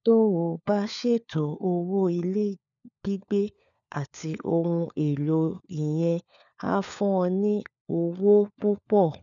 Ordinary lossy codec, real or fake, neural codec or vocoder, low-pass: none; fake; codec, 16 kHz, 4 kbps, FunCodec, trained on LibriTTS, 50 frames a second; 7.2 kHz